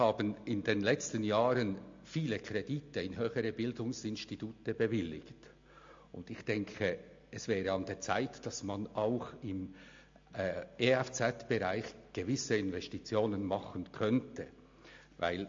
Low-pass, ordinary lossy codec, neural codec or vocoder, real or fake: 7.2 kHz; MP3, 48 kbps; none; real